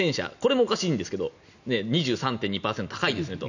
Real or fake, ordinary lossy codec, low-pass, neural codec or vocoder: real; none; 7.2 kHz; none